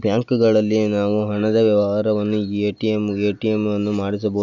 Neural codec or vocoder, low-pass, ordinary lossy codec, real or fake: none; 7.2 kHz; none; real